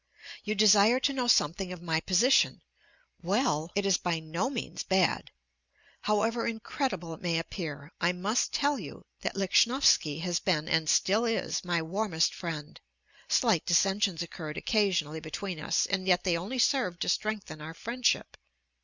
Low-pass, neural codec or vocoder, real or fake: 7.2 kHz; none; real